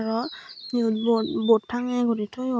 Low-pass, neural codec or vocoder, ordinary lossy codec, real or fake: none; none; none; real